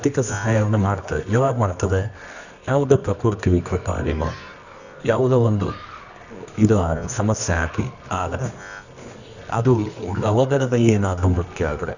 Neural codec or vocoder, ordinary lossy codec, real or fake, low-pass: codec, 24 kHz, 0.9 kbps, WavTokenizer, medium music audio release; none; fake; 7.2 kHz